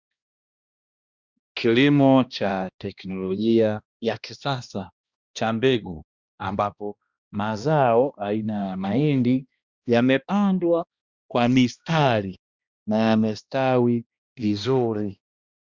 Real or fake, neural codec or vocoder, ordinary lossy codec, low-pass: fake; codec, 16 kHz, 1 kbps, X-Codec, HuBERT features, trained on balanced general audio; Opus, 64 kbps; 7.2 kHz